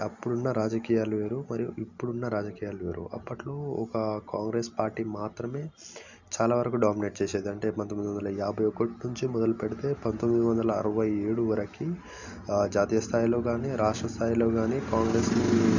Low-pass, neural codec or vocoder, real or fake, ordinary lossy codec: 7.2 kHz; none; real; none